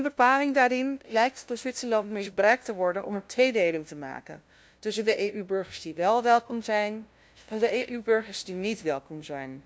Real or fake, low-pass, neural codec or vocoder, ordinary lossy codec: fake; none; codec, 16 kHz, 0.5 kbps, FunCodec, trained on LibriTTS, 25 frames a second; none